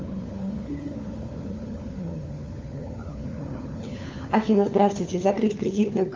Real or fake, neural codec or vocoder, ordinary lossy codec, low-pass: fake; codec, 16 kHz, 4 kbps, FunCodec, trained on LibriTTS, 50 frames a second; Opus, 32 kbps; 7.2 kHz